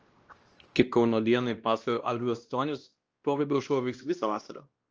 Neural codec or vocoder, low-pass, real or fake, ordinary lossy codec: codec, 16 kHz, 1 kbps, X-Codec, WavLM features, trained on Multilingual LibriSpeech; 7.2 kHz; fake; Opus, 24 kbps